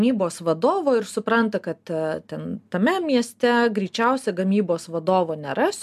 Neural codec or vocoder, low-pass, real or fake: none; 14.4 kHz; real